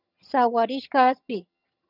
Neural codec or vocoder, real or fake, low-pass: vocoder, 22.05 kHz, 80 mel bands, HiFi-GAN; fake; 5.4 kHz